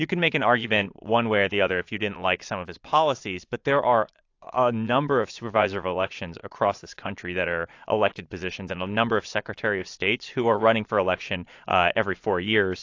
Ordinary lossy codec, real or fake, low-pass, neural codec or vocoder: AAC, 48 kbps; fake; 7.2 kHz; vocoder, 22.05 kHz, 80 mel bands, Vocos